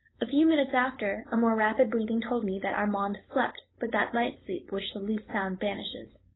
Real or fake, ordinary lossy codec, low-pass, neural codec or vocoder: fake; AAC, 16 kbps; 7.2 kHz; codec, 16 kHz, 4.8 kbps, FACodec